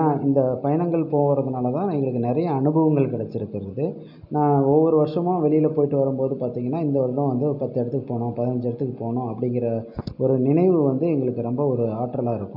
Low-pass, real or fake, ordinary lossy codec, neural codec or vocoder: 5.4 kHz; real; none; none